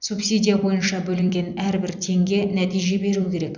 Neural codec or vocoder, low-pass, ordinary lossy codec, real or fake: vocoder, 44.1 kHz, 128 mel bands every 256 samples, BigVGAN v2; 7.2 kHz; none; fake